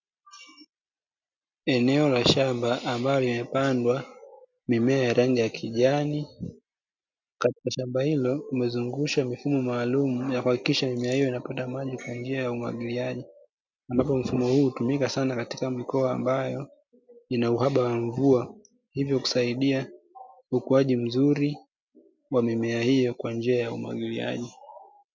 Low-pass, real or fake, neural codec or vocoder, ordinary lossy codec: 7.2 kHz; real; none; MP3, 64 kbps